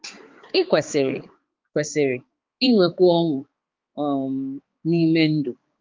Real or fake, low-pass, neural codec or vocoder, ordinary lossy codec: fake; 7.2 kHz; codec, 16 kHz, 4 kbps, X-Codec, HuBERT features, trained on balanced general audio; Opus, 32 kbps